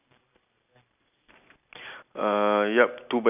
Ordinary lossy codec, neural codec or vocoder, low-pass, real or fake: none; none; 3.6 kHz; real